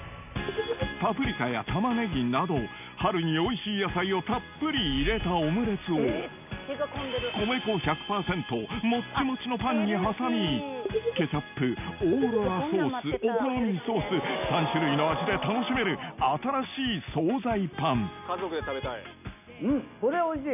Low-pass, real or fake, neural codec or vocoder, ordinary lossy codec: 3.6 kHz; real; none; none